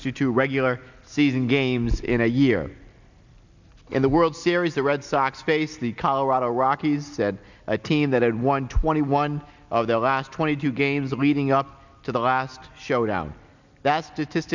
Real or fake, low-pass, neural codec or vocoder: real; 7.2 kHz; none